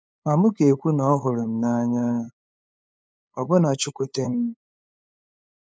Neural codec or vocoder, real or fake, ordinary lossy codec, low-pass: codec, 16 kHz, 8 kbps, FunCodec, trained on LibriTTS, 25 frames a second; fake; none; none